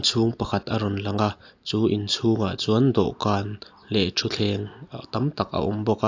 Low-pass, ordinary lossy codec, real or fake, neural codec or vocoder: 7.2 kHz; AAC, 48 kbps; real; none